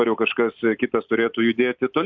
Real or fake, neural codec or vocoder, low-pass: fake; vocoder, 44.1 kHz, 128 mel bands every 256 samples, BigVGAN v2; 7.2 kHz